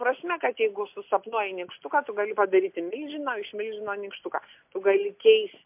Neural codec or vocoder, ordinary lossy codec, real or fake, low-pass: none; MP3, 32 kbps; real; 3.6 kHz